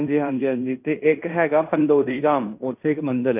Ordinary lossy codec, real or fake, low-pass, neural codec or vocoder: none; fake; 3.6 kHz; codec, 16 kHz in and 24 kHz out, 0.9 kbps, LongCat-Audio-Codec, fine tuned four codebook decoder